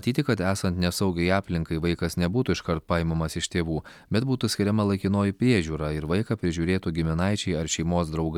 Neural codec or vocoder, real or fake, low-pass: none; real; 19.8 kHz